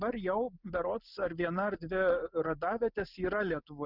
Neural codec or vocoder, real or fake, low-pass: none; real; 5.4 kHz